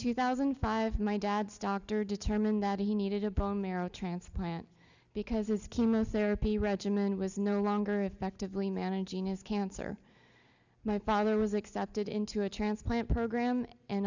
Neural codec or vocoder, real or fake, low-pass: none; real; 7.2 kHz